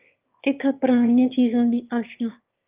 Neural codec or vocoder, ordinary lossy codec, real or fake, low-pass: autoencoder, 22.05 kHz, a latent of 192 numbers a frame, VITS, trained on one speaker; Opus, 24 kbps; fake; 3.6 kHz